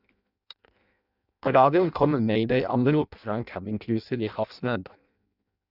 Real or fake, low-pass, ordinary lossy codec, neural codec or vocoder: fake; 5.4 kHz; none; codec, 16 kHz in and 24 kHz out, 0.6 kbps, FireRedTTS-2 codec